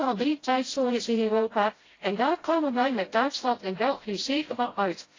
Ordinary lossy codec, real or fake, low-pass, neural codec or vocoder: AAC, 32 kbps; fake; 7.2 kHz; codec, 16 kHz, 0.5 kbps, FreqCodec, smaller model